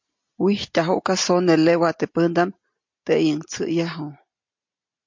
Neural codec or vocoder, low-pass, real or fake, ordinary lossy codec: none; 7.2 kHz; real; MP3, 48 kbps